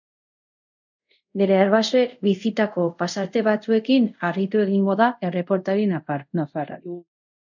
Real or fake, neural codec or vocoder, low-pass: fake; codec, 24 kHz, 0.5 kbps, DualCodec; 7.2 kHz